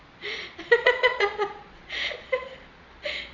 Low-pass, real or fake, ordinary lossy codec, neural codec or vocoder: 7.2 kHz; fake; Opus, 64 kbps; vocoder, 22.05 kHz, 80 mel bands, Vocos